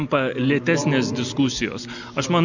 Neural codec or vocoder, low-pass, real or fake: none; 7.2 kHz; real